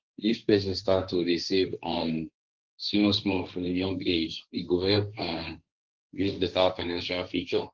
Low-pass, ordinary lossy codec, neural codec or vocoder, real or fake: 7.2 kHz; Opus, 24 kbps; codec, 16 kHz, 1.1 kbps, Voila-Tokenizer; fake